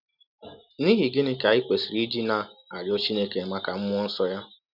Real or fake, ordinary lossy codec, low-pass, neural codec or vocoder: real; none; 5.4 kHz; none